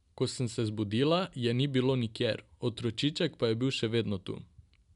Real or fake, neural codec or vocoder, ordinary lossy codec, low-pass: real; none; none; 10.8 kHz